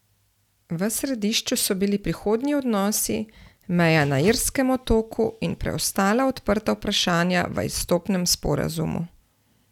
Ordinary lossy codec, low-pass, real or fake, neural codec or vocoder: none; 19.8 kHz; real; none